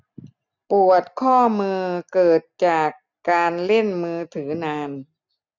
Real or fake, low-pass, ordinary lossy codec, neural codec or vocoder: real; 7.2 kHz; none; none